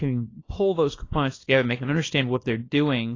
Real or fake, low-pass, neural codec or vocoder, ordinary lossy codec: fake; 7.2 kHz; codec, 24 kHz, 0.9 kbps, WavTokenizer, small release; AAC, 32 kbps